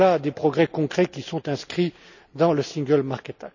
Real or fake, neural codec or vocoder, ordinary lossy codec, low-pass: real; none; none; 7.2 kHz